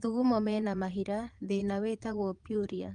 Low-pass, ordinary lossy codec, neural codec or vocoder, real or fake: 9.9 kHz; Opus, 32 kbps; vocoder, 22.05 kHz, 80 mel bands, Vocos; fake